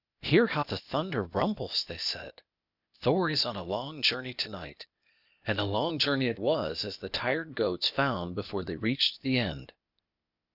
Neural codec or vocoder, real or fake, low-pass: codec, 16 kHz, 0.8 kbps, ZipCodec; fake; 5.4 kHz